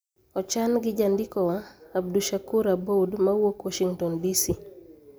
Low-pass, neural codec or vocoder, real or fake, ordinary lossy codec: none; none; real; none